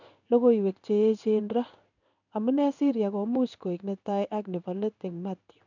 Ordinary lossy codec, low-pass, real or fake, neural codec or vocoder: none; 7.2 kHz; fake; codec, 16 kHz in and 24 kHz out, 1 kbps, XY-Tokenizer